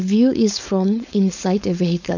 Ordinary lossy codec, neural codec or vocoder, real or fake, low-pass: none; codec, 16 kHz, 4.8 kbps, FACodec; fake; 7.2 kHz